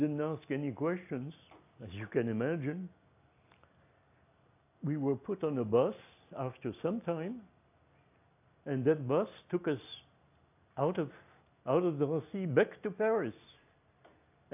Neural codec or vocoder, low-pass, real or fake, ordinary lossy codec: none; 3.6 kHz; real; AAC, 32 kbps